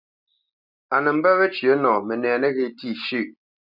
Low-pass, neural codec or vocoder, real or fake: 5.4 kHz; none; real